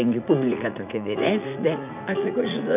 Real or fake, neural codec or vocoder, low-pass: fake; vocoder, 44.1 kHz, 80 mel bands, Vocos; 3.6 kHz